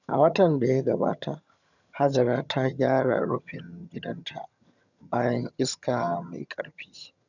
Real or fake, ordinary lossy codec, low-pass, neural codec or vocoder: fake; none; 7.2 kHz; vocoder, 22.05 kHz, 80 mel bands, HiFi-GAN